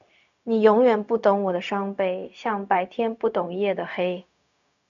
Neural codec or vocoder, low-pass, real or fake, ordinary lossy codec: codec, 16 kHz, 0.4 kbps, LongCat-Audio-Codec; 7.2 kHz; fake; MP3, 96 kbps